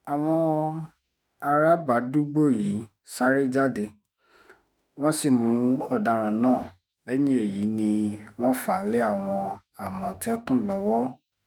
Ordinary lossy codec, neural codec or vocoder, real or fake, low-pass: none; autoencoder, 48 kHz, 32 numbers a frame, DAC-VAE, trained on Japanese speech; fake; none